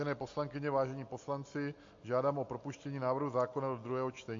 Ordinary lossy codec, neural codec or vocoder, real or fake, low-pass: MP3, 48 kbps; none; real; 7.2 kHz